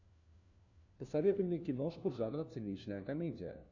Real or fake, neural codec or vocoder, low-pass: fake; codec, 16 kHz, 1 kbps, FunCodec, trained on LibriTTS, 50 frames a second; 7.2 kHz